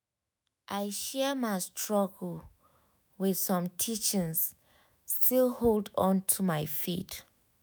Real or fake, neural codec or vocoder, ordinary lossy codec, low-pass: fake; autoencoder, 48 kHz, 128 numbers a frame, DAC-VAE, trained on Japanese speech; none; none